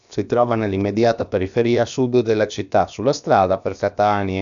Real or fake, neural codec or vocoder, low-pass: fake; codec, 16 kHz, about 1 kbps, DyCAST, with the encoder's durations; 7.2 kHz